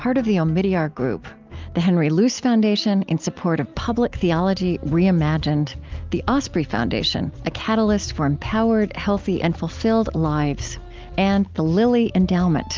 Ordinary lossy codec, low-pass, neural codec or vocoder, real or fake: Opus, 24 kbps; 7.2 kHz; none; real